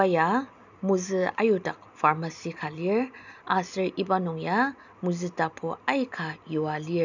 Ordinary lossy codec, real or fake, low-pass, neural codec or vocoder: none; real; 7.2 kHz; none